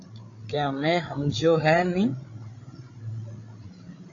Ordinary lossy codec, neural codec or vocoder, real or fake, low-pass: AAC, 48 kbps; codec, 16 kHz, 8 kbps, FreqCodec, larger model; fake; 7.2 kHz